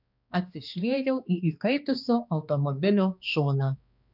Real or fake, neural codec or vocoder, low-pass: fake; codec, 16 kHz, 2 kbps, X-Codec, HuBERT features, trained on balanced general audio; 5.4 kHz